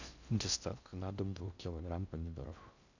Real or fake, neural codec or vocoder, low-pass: fake; codec, 16 kHz in and 24 kHz out, 0.6 kbps, FocalCodec, streaming, 4096 codes; 7.2 kHz